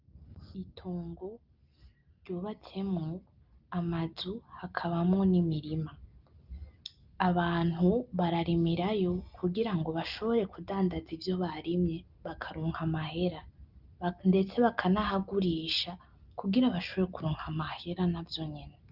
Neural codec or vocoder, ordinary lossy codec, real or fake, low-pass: none; Opus, 24 kbps; real; 5.4 kHz